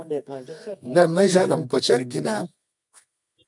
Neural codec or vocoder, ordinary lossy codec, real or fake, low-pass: codec, 24 kHz, 0.9 kbps, WavTokenizer, medium music audio release; MP3, 64 kbps; fake; 10.8 kHz